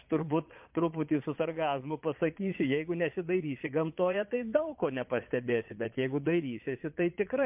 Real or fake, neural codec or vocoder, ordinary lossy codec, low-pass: fake; vocoder, 22.05 kHz, 80 mel bands, Vocos; MP3, 32 kbps; 3.6 kHz